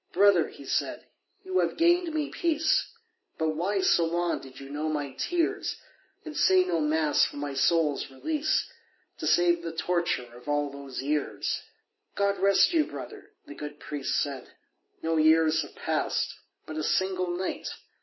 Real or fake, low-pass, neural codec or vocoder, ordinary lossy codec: real; 7.2 kHz; none; MP3, 24 kbps